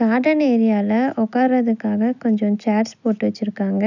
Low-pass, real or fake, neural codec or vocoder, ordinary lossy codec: 7.2 kHz; real; none; none